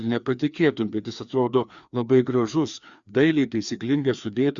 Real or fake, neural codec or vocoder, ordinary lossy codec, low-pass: fake; codec, 16 kHz, 2 kbps, FreqCodec, larger model; Opus, 64 kbps; 7.2 kHz